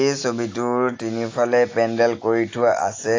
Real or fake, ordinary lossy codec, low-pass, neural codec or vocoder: real; AAC, 32 kbps; 7.2 kHz; none